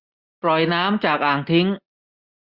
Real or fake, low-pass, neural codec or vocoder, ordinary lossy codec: real; 5.4 kHz; none; Opus, 64 kbps